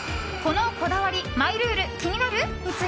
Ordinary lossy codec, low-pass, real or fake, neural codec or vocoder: none; none; real; none